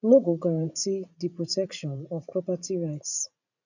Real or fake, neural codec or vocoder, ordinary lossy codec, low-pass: fake; vocoder, 44.1 kHz, 80 mel bands, Vocos; none; 7.2 kHz